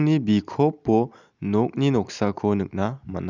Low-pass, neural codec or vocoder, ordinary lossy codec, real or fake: 7.2 kHz; none; none; real